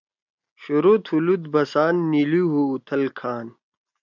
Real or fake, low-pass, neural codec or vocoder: real; 7.2 kHz; none